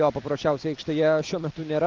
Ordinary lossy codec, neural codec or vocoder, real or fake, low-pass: Opus, 32 kbps; none; real; 7.2 kHz